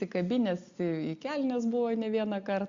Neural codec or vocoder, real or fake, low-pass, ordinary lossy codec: none; real; 7.2 kHz; Opus, 64 kbps